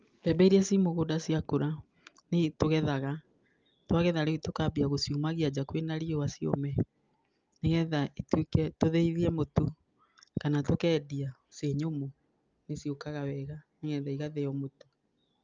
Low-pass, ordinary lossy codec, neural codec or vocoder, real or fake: 7.2 kHz; Opus, 24 kbps; none; real